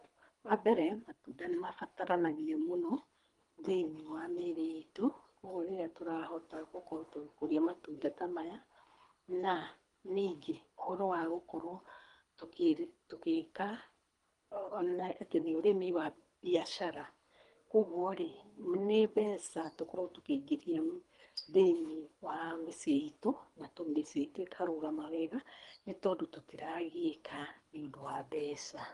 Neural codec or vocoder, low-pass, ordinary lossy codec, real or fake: codec, 24 kHz, 3 kbps, HILCodec; 10.8 kHz; Opus, 32 kbps; fake